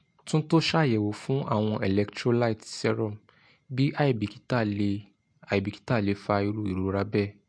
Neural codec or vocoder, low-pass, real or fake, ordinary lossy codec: none; 9.9 kHz; real; MP3, 48 kbps